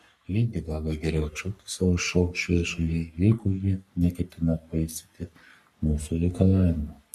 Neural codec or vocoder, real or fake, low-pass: codec, 44.1 kHz, 3.4 kbps, Pupu-Codec; fake; 14.4 kHz